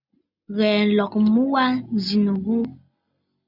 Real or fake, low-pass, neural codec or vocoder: real; 5.4 kHz; none